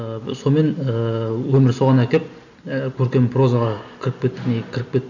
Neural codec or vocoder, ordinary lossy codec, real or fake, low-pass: none; none; real; 7.2 kHz